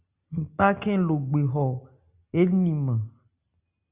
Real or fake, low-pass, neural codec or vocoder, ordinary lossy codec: real; 3.6 kHz; none; Opus, 64 kbps